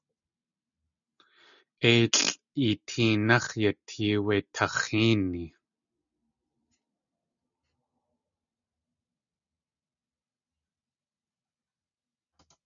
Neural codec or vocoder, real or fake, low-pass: none; real; 7.2 kHz